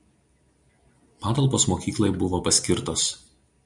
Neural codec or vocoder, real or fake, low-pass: none; real; 10.8 kHz